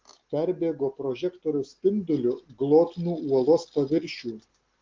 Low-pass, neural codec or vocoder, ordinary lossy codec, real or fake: 7.2 kHz; none; Opus, 16 kbps; real